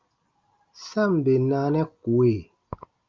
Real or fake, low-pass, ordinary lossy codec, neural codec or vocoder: real; 7.2 kHz; Opus, 24 kbps; none